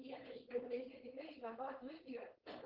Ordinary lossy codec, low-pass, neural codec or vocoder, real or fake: Opus, 16 kbps; 5.4 kHz; codec, 16 kHz, 4.8 kbps, FACodec; fake